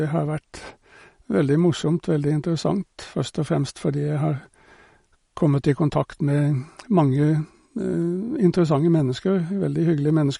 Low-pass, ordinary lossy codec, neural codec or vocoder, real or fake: 10.8 kHz; MP3, 48 kbps; none; real